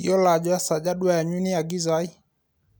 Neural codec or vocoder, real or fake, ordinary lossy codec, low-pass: none; real; none; none